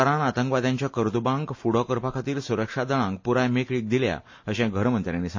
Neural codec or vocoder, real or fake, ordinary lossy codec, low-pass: none; real; MP3, 32 kbps; 7.2 kHz